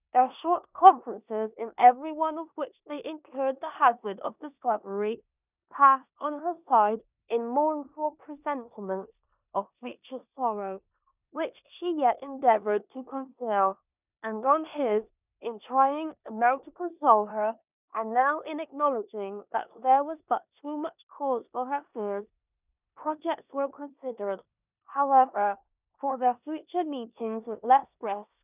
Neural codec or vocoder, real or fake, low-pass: codec, 16 kHz in and 24 kHz out, 0.9 kbps, LongCat-Audio-Codec, four codebook decoder; fake; 3.6 kHz